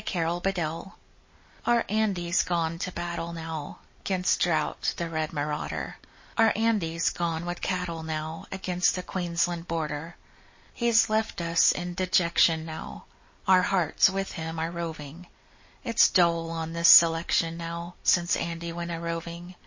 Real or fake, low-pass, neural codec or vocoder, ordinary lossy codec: real; 7.2 kHz; none; MP3, 32 kbps